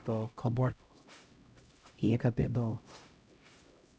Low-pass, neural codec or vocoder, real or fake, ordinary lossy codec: none; codec, 16 kHz, 0.5 kbps, X-Codec, HuBERT features, trained on LibriSpeech; fake; none